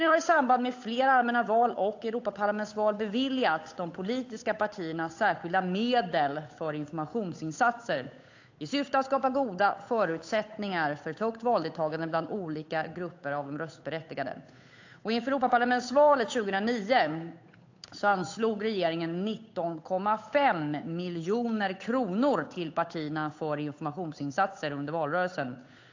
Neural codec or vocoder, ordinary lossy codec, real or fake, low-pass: codec, 16 kHz, 8 kbps, FunCodec, trained on Chinese and English, 25 frames a second; AAC, 48 kbps; fake; 7.2 kHz